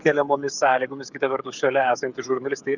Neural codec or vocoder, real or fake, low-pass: codec, 16 kHz, 8 kbps, FreqCodec, smaller model; fake; 7.2 kHz